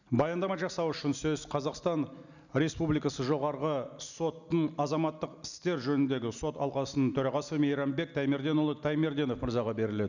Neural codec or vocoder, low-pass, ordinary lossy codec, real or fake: none; 7.2 kHz; none; real